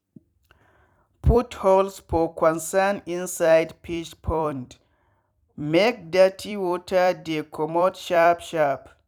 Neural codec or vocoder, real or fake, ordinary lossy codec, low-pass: vocoder, 44.1 kHz, 128 mel bands every 256 samples, BigVGAN v2; fake; none; 19.8 kHz